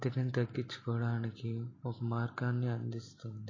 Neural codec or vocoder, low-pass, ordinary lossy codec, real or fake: none; 7.2 kHz; MP3, 32 kbps; real